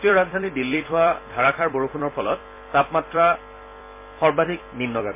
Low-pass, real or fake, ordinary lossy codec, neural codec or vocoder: 3.6 kHz; fake; MP3, 24 kbps; vocoder, 44.1 kHz, 128 mel bands every 256 samples, BigVGAN v2